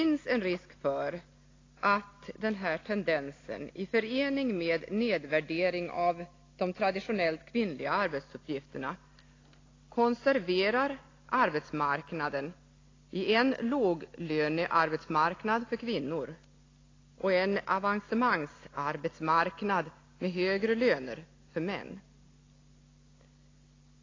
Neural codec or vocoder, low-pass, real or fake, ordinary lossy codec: none; 7.2 kHz; real; AAC, 32 kbps